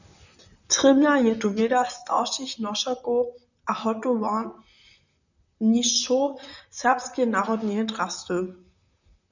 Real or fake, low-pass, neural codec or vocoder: fake; 7.2 kHz; vocoder, 44.1 kHz, 128 mel bands, Pupu-Vocoder